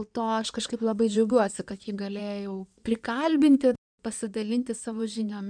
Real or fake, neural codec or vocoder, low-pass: fake; codec, 16 kHz in and 24 kHz out, 2.2 kbps, FireRedTTS-2 codec; 9.9 kHz